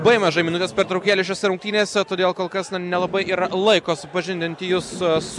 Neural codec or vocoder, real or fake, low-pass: none; real; 10.8 kHz